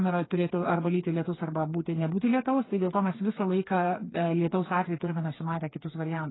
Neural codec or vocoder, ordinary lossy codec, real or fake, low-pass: codec, 16 kHz, 4 kbps, FreqCodec, smaller model; AAC, 16 kbps; fake; 7.2 kHz